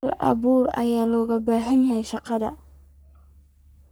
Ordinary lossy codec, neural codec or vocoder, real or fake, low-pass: none; codec, 44.1 kHz, 3.4 kbps, Pupu-Codec; fake; none